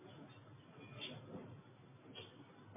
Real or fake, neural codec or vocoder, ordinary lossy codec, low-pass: real; none; MP3, 16 kbps; 3.6 kHz